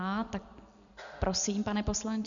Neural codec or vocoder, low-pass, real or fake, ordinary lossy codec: none; 7.2 kHz; real; MP3, 96 kbps